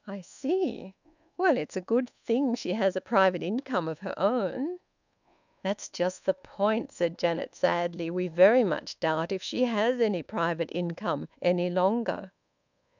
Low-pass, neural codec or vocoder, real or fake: 7.2 kHz; codec, 24 kHz, 1.2 kbps, DualCodec; fake